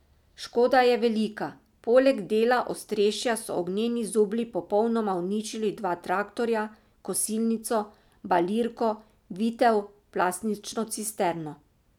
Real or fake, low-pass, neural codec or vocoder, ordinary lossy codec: real; 19.8 kHz; none; none